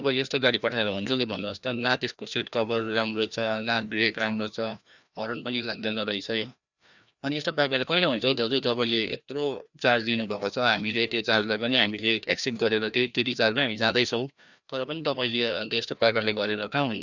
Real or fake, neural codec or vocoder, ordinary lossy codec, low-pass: fake; codec, 16 kHz, 1 kbps, FreqCodec, larger model; none; 7.2 kHz